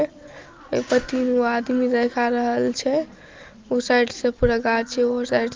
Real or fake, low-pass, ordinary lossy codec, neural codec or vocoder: real; 7.2 kHz; Opus, 32 kbps; none